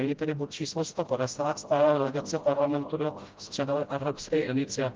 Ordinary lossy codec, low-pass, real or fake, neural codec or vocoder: Opus, 32 kbps; 7.2 kHz; fake; codec, 16 kHz, 0.5 kbps, FreqCodec, smaller model